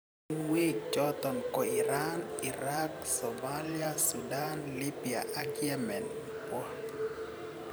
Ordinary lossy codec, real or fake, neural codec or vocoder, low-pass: none; fake; vocoder, 44.1 kHz, 128 mel bands every 512 samples, BigVGAN v2; none